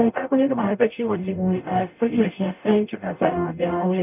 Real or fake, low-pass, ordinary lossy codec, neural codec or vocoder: fake; 3.6 kHz; none; codec, 44.1 kHz, 0.9 kbps, DAC